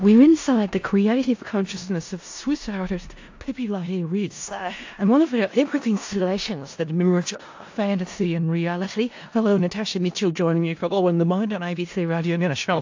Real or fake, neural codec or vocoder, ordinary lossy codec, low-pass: fake; codec, 16 kHz in and 24 kHz out, 0.4 kbps, LongCat-Audio-Codec, four codebook decoder; AAC, 48 kbps; 7.2 kHz